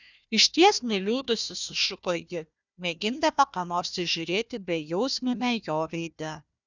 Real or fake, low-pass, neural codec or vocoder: fake; 7.2 kHz; codec, 16 kHz, 1 kbps, FunCodec, trained on Chinese and English, 50 frames a second